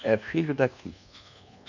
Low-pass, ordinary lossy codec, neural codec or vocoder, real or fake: 7.2 kHz; none; codec, 24 kHz, 1.2 kbps, DualCodec; fake